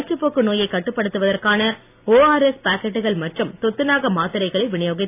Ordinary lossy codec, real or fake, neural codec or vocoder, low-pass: AAC, 32 kbps; real; none; 3.6 kHz